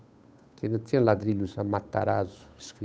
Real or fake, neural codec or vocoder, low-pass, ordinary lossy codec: fake; codec, 16 kHz, 8 kbps, FunCodec, trained on Chinese and English, 25 frames a second; none; none